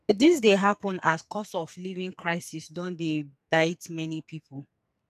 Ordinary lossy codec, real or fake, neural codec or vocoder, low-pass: none; fake; codec, 44.1 kHz, 2.6 kbps, SNAC; 14.4 kHz